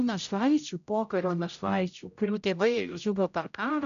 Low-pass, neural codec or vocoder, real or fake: 7.2 kHz; codec, 16 kHz, 0.5 kbps, X-Codec, HuBERT features, trained on general audio; fake